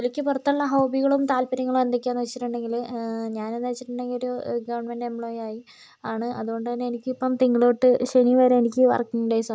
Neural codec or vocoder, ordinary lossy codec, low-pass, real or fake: none; none; none; real